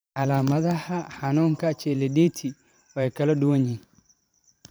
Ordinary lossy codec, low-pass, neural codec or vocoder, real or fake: none; none; vocoder, 44.1 kHz, 128 mel bands every 512 samples, BigVGAN v2; fake